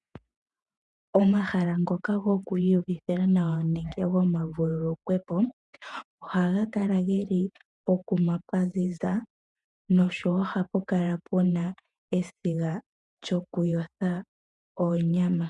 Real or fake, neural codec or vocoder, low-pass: fake; vocoder, 48 kHz, 128 mel bands, Vocos; 10.8 kHz